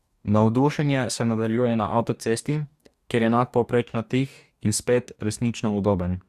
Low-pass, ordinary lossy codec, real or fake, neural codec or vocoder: 14.4 kHz; Opus, 64 kbps; fake; codec, 44.1 kHz, 2.6 kbps, DAC